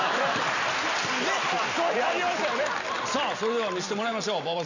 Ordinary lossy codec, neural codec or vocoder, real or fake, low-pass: none; none; real; 7.2 kHz